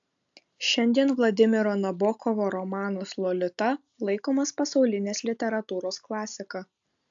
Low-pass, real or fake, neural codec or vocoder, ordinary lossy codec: 7.2 kHz; real; none; AAC, 64 kbps